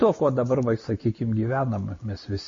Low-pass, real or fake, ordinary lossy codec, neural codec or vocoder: 7.2 kHz; real; MP3, 32 kbps; none